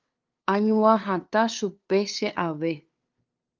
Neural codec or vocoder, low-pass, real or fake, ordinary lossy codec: codec, 16 kHz, 2 kbps, FunCodec, trained on LibriTTS, 25 frames a second; 7.2 kHz; fake; Opus, 24 kbps